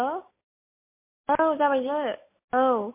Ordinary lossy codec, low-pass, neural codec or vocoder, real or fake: MP3, 24 kbps; 3.6 kHz; none; real